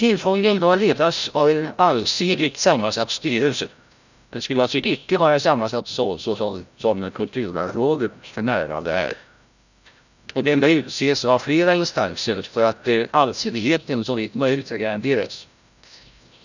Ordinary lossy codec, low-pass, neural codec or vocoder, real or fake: none; 7.2 kHz; codec, 16 kHz, 0.5 kbps, FreqCodec, larger model; fake